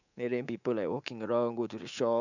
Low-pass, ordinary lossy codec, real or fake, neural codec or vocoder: 7.2 kHz; none; fake; codec, 24 kHz, 3.1 kbps, DualCodec